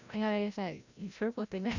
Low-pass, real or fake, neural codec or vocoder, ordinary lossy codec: 7.2 kHz; fake; codec, 16 kHz, 0.5 kbps, FreqCodec, larger model; none